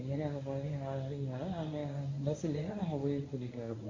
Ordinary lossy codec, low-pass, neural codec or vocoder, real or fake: AAC, 32 kbps; 7.2 kHz; codec, 24 kHz, 0.9 kbps, WavTokenizer, medium speech release version 1; fake